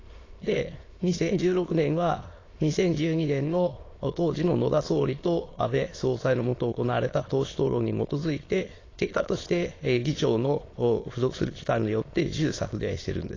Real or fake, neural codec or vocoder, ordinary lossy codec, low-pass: fake; autoencoder, 22.05 kHz, a latent of 192 numbers a frame, VITS, trained on many speakers; AAC, 32 kbps; 7.2 kHz